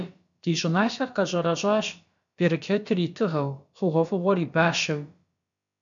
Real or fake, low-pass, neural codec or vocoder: fake; 7.2 kHz; codec, 16 kHz, about 1 kbps, DyCAST, with the encoder's durations